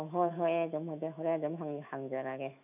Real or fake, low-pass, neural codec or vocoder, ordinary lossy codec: fake; 3.6 kHz; autoencoder, 48 kHz, 128 numbers a frame, DAC-VAE, trained on Japanese speech; none